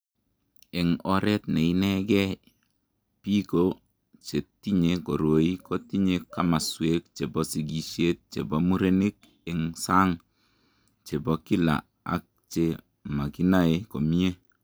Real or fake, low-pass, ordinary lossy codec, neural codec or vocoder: real; none; none; none